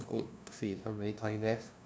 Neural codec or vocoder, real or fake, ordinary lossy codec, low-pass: codec, 16 kHz, 0.5 kbps, FunCodec, trained on LibriTTS, 25 frames a second; fake; none; none